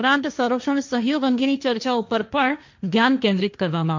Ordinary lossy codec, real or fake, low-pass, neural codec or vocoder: MP3, 64 kbps; fake; 7.2 kHz; codec, 16 kHz, 1.1 kbps, Voila-Tokenizer